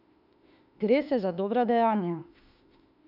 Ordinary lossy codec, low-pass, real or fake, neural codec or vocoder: none; 5.4 kHz; fake; autoencoder, 48 kHz, 32 numbers a frame, DAC-VAE, trained on Japanese speech